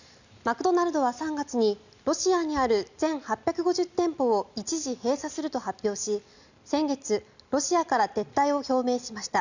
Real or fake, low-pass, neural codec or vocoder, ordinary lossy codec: real; 7.2 kHz; none; none